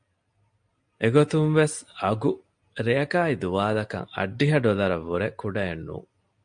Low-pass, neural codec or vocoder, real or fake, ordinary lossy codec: 9.9 kHz; none; real; MP3, 48 kbps